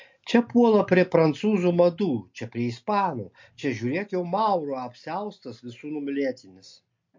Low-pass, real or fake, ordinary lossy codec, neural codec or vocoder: 7.2 kHz; real; MP3, 48 kbps; none